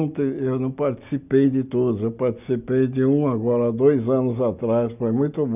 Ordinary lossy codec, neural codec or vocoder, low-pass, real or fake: none; none; 3.6 kHz; real